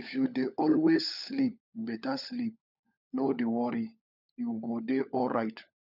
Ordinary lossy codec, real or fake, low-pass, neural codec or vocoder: none; fake; 5.4 kHz; codec, 16 kHz, 16 kbps, FunCodec, trained on LibriTTS, 50 frames a second